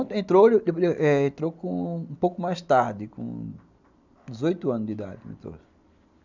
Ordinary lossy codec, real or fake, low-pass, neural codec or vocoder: none; real; 7.2 kHz; none